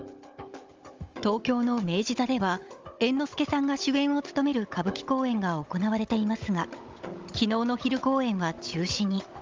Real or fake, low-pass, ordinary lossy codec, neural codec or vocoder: fake; 7.2 kHz; Opus, 32 kbps; codec, 16 kHz, 16 kbps, FunCodec, trained on Chinese and English, 50 frames a second